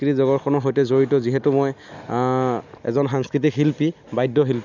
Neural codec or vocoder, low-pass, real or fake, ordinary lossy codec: none; 7.2 kHz; real; none